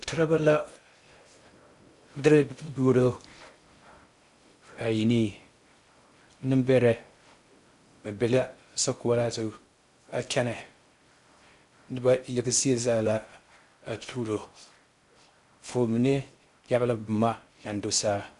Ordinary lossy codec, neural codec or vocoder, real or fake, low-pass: Opus, 64 kbps; codec, 16 kHz in and 24 kHz out, 0.6 kbps, FocalCodec, streaming, 2048 codes; fake; 10.8 kHz